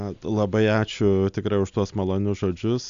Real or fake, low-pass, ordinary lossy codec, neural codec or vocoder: real; 7.2 kHz; Opus, 64 kbps; none